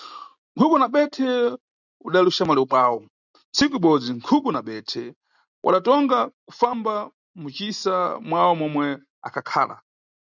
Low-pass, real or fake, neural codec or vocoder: 7.2 kHz; real; none